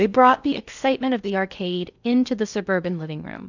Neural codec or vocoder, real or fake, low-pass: codec, 16 kHz in and 24 kHz out, 0.6 kbps, FocalCodec, streaming, 4096 codes; fake; 7.2 kHz